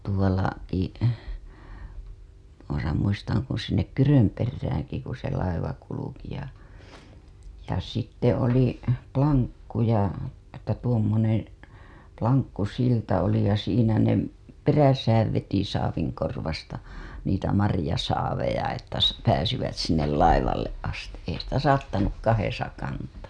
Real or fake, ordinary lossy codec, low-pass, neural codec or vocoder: real; none; none; none